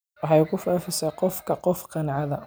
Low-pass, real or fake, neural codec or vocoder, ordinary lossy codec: none; real; none; none